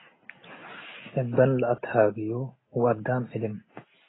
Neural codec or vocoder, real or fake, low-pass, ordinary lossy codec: none; real; 7.2 kHz; AAC, 16 kbps